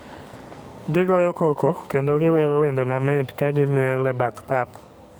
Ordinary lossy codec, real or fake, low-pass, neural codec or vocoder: none; fake; none; codec, 44.1 kHz, 2.6 kbps, SNAC